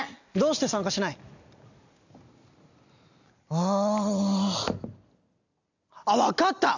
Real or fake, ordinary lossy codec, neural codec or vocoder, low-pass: real; none; none; 7.2 kHz